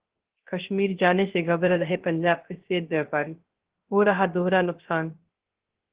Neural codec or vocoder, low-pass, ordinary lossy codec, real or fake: codec, 16 kHz, 0.3 kbps, FocalCodec; 3.6 kHz; Opus, 16 kbps; fake